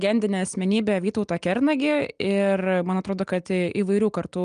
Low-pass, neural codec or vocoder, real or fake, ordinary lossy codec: 9.9 kHz; none; real; Opus, 32 kbps